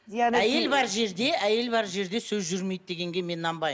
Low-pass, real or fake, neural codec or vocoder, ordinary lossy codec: none; real; none; none